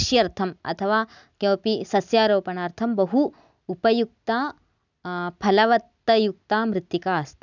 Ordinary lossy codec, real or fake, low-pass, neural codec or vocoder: none; real; 7.2 kHz; none